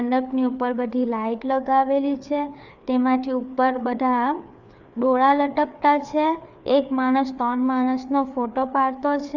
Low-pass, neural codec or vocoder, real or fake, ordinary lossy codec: 7.2 kHz; codec, 16 kHz, 4 kbps, FreqCodec, larger model; fake; none